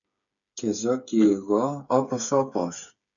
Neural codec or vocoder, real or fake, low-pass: codec, 16 kHz, 8 kbps, FreqCodec, smaller model; fake; 7.2 kHz